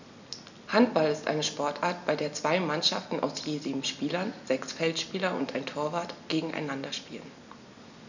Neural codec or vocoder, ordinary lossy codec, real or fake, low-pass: none; none; real; 7.2 kHz